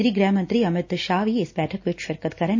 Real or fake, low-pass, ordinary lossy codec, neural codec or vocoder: real; 7.2 kHz; MP3, 32 kbps; none